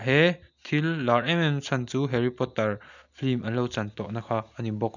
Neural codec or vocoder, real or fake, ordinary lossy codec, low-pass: none; real; none; 7.2 kHz